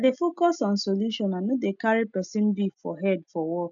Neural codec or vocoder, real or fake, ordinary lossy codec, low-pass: none; real; none; 7.2 kHz